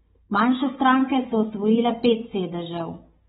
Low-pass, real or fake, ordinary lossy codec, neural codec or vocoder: 7.2 kHz; fake; AAC, 16 kbps; codec, 16 kHz, 16 kbps, FunCodec, trained on Chinese and English, 50 frames a second